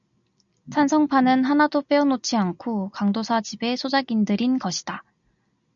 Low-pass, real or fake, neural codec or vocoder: 7.2 kHz; real; none